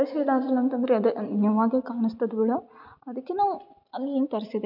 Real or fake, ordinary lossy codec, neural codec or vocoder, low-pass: real; none; none; 5.4 kHz